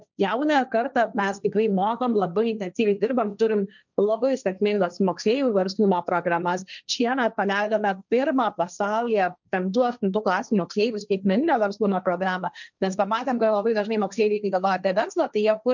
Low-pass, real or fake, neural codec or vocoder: 7.2 kHz; fake; codec, 16 kHz, 1.1 kbps, Voila-Tokenizer